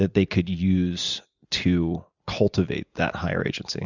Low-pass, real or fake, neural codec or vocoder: 7.2 kHz; real; none